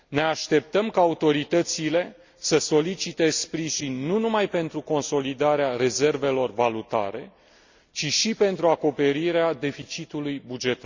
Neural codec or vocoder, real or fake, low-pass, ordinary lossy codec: none; real; 7.2 kHz; Opus, 64 kbps